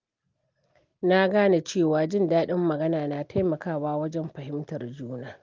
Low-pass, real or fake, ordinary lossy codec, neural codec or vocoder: 7.2 kHz; real; Opus, 32 kbps; none